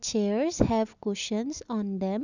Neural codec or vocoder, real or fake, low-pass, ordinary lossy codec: none; real; 7.2 kHz; none